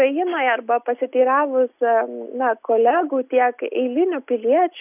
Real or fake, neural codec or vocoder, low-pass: real; none; 3.6 kHz